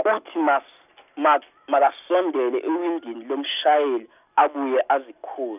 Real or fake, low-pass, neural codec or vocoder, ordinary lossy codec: real; 3.6 kHz; none; none